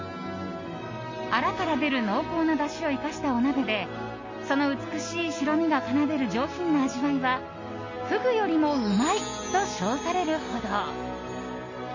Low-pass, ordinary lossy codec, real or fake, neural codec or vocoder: 7.2 kHz; MP3, 32 kbps; real; none